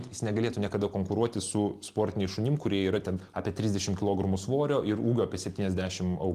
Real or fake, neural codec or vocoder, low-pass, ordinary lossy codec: real; none; 14.4 kHz; Opus, 24 kbps